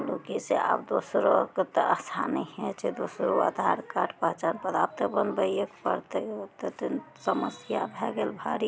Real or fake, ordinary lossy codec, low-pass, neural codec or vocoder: real; none; none; none